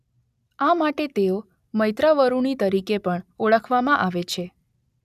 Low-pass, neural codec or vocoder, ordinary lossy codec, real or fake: 14.4 kHz; none; none; real